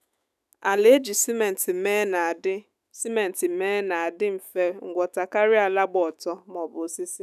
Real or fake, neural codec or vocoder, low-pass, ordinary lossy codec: fake; autoencoder, 48 kHz, 128 numbers a frame, DAC-VAE, trained on Japanese speech; 14.4 kHz; none